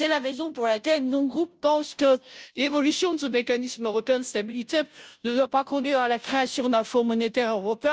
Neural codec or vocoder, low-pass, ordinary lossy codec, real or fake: codec, 16 kHz, 0.5 kbps, FunCodec, trained on Chinese and English, 25 frames a second; none; none; fake